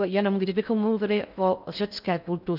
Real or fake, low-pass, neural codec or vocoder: fake; 5.4 kHz; codec, 16 kHz in and 24 kHz out, 0.6 kbps, FocalCodec, streaming, 4096 codes